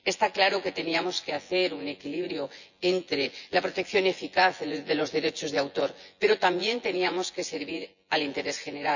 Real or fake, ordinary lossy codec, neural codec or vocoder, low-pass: fake; none; vocoder, 24 kHz, 100 mel bands, Vocos; 7.2 kHz